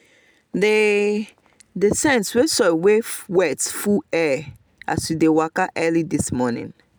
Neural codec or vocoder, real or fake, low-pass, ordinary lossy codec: none; real; none; none